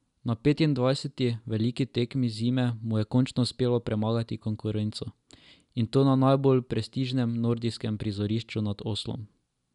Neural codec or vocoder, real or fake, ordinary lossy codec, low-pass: none; real; none; 10.8 kHz